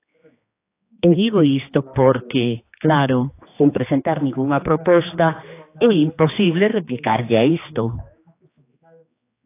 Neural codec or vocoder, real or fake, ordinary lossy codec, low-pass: codec, 16 kHz, 2 kbps, X-Codec, HuBERT features, trained on general audio; fake; AAC, 24 kbps; 3.6 kHz